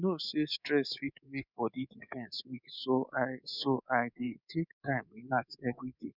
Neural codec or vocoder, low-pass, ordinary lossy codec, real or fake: codec, 24 kHz, 3.1 kbps, DualCodec; 5.4 kHz; none; fake